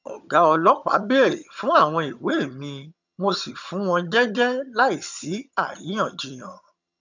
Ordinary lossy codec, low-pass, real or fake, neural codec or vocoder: none; 7.2 kHz; fake; vocoder, 22.05 kHz, 80 mel bands, HiFi-GAN